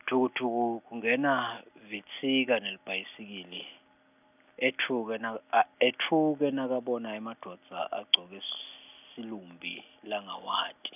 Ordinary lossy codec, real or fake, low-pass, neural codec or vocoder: none; real; 3.6 kHz; none